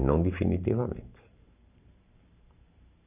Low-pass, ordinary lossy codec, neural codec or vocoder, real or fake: 3.6 kHz; none; vocoder, 44.1 kHz, 128 mel bands every 512 samples, BigVGAN v2; fake